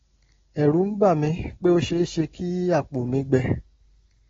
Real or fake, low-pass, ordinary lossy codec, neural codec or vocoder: real; 7.2 kHz; AAC, 24 kbps; none